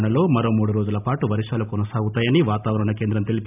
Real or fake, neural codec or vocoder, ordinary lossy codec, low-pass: real; none; none; 3.6 kHz